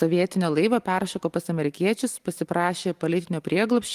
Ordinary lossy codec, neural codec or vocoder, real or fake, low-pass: Opus, 24 kbps; none; real; 14.4 kHz